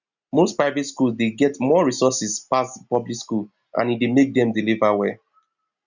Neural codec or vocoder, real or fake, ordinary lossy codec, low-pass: none; real; none; 7.2 kHz